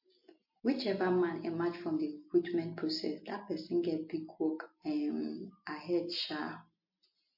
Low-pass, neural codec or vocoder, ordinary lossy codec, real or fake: 5.4 kHz; none; MP3, 32 kbps; real